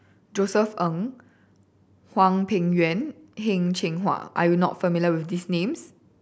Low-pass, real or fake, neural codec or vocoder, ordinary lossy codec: none; real; none; none